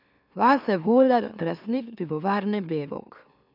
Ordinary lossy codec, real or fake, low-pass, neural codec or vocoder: none; fake; 5.4 kHz; autoencoder, 44.1 kHz, a latent of 192 numbers a frame, MeloTTS